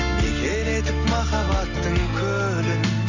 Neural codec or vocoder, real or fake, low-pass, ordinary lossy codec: none; real; 7.2 kHz; none